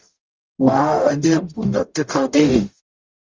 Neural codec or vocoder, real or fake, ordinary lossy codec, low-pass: codec, 44.1 kHz, 0.9 kbps, DAC; fake; Opus, 32 kbps; 7.2 kHz